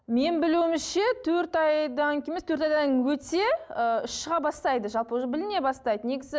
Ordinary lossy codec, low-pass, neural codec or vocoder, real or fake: none; none; none; real